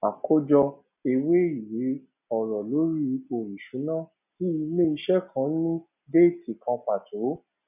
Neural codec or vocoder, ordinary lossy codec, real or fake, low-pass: none; none; real; 3.6 kHz